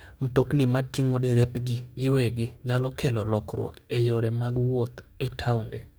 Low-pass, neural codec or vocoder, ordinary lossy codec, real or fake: none; codec, 44.1 kHz, 2.6 kbps, DAC; none; fake